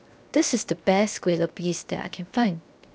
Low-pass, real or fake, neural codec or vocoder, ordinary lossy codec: none; fake; codec, 16 kHz, 0.7 kbps, FocalCodec; none